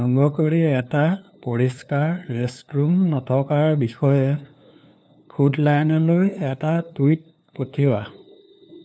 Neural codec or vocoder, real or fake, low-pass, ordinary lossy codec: codec, 16 kHz, 2 kbps, FunCodec, trained on LibriTTS, 25 frames a second; fake; none; none